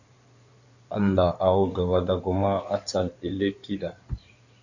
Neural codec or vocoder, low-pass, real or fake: codec, 16 kHz in and 24 kHz out, 2.2 kbps, FireRedTTS-2 codec; 7.2 kHz; fake